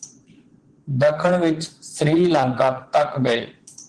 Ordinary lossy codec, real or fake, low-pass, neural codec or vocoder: Opus, 16 kbps; fake; 9.9 kHz; vocoder, 22.05 kHz, 80 mel bands, Vocos